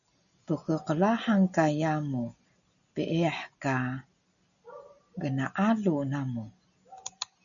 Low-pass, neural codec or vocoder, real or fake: 7.2 kHz; none; real